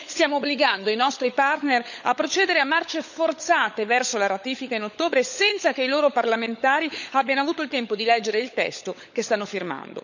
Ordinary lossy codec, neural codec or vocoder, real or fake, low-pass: none; codec, 16 kHz, 16 kbps, FunCodec, trained on LibriTTS, 50 frames a second; fake; 7.2 kHz